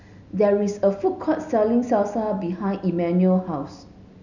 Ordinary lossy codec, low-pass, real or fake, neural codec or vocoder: none; 7.2 kHz; real; none